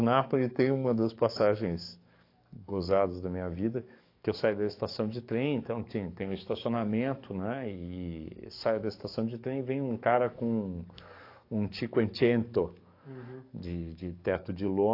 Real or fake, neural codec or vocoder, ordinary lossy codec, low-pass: fake; codec, 44.1 kHz, 7.8 kbps, DAC; AAC, 32 kbps; 5.4 kHz